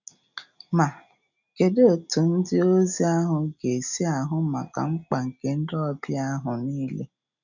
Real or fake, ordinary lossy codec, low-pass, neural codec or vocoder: real; none; 7.2 kHz; none